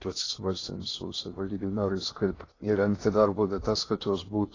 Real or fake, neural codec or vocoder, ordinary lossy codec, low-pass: fake; codec, 16 kHz in and 24 kHz out, 0.6 kbps, FocalCodec, streaming, 2048 codes; AAC, 32 kbps; 7.2 kHz